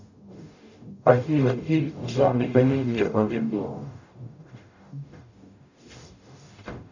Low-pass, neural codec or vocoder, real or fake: 7.2 kHz; codec, 44.1 kHz, 0.9 kbps, DAC; fake